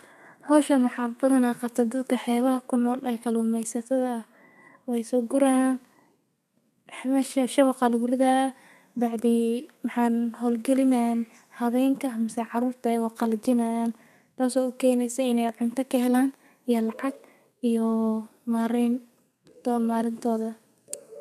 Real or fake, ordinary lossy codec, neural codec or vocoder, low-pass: fake; none; codec, 32 kHz, 1.9 kbps, SNAC; 14.4 kHz